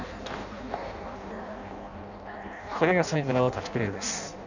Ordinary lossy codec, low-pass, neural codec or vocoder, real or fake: none; 7.2 kHz; codec, 16 kHz in and 24 kHz out, 0.6 kbps, FireRedTTS-2 codec; fake